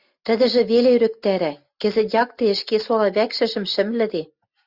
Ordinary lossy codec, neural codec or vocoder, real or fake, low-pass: Opus, 64 kbps; none; real; 5.4 kHz